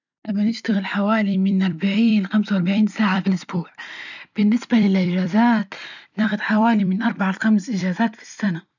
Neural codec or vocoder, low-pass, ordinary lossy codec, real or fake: vocoder, 44.1 kHz, 128 mel bands every 256 samples, BigVGAN v2; 7.2 kHz; none; fake